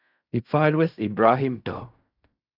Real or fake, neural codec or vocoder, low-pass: fake; codec, 16 kHz in and 24 kHz out, 0.4 kbps, LongCat-Audio-Codec, fine tuned four codebook decoder; 5.4 kHz